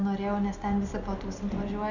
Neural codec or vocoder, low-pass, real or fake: none; 7.2 kHz; real